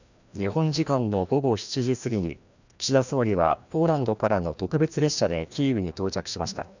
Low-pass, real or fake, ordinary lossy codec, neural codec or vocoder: 7.2 kHz; fake; none; codec, 16 kHz, 1 kbps, FreqCodec, larger model